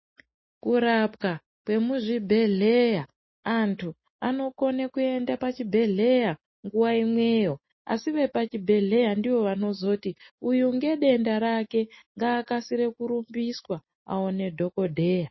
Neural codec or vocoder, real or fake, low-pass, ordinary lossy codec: none; real; 7.2 kHz; MP3, 24 kbps